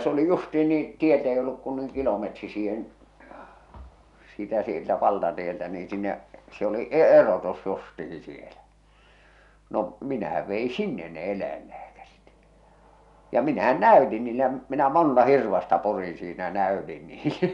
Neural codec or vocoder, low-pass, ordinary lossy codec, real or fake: none; 10.8 kHz; none; real